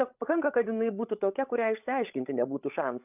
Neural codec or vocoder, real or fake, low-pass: codec, 16 kHz, 16 kbps, FreqCodec, larger model; fake; 3.6 kHz